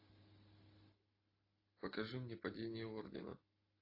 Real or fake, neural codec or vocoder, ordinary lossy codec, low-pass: real; none; AAC, 32 kbps; 5.4 kHz